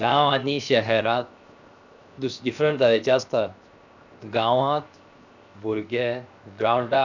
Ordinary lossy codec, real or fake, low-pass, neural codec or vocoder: none; fake; 7.2 kHz; codec, 16 kHz, 0.7 kbps, FocalCodec